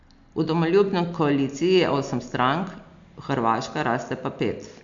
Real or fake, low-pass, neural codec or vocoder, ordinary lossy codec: real; 7.2 kHz; none; MP3, 64 kbps